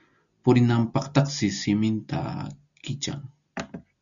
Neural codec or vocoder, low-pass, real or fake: none; 7.2 kHz; real